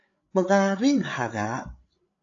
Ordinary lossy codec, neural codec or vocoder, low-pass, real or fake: AAC, 48 kbps; codec, 16 kHz, 8 kbps, FreqCodec, larger model; 7.2 kHz; fake